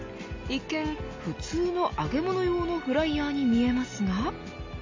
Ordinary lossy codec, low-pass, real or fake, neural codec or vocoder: MP3, 48 kbps; 7.2 kHz; real; none